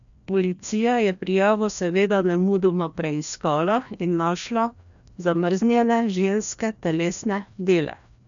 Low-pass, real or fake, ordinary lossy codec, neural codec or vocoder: 7.2 kHz; fake; none; codec, 16 kHz, 1 kbps, FreqCodec, larger model